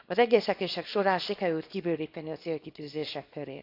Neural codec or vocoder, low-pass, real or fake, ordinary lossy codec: codec, 24 kHz, 0.9 kbps, WavTokenizer, small release; 5.4 kHz; fake; AAC, 32 kbps